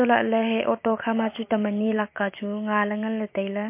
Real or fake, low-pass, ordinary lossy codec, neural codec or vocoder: fake; 3.6 kHz; MP3, 24 kbps; vocoder, 44.1 kHz, 128 mel bands every 256 samples, BigVGAN v2